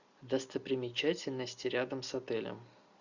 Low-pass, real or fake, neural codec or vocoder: 7.2 kHz; real; none